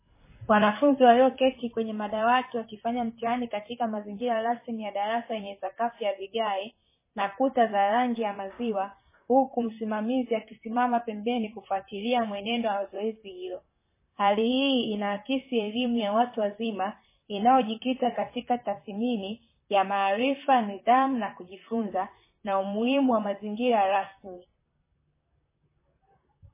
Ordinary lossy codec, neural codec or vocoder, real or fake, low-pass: MP3, 16 kbps; vocoder, 44.1 kHz, 128 mel bands, Pupu-Vocoder; fake; 3.6 kHz